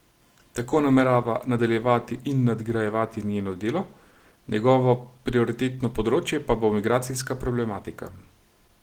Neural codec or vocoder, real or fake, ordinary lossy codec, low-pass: none; real; Opus, 16 kbps; 19.8 kHz